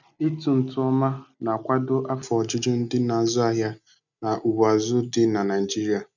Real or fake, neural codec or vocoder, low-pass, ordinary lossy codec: real; none; 7.2 kHz; none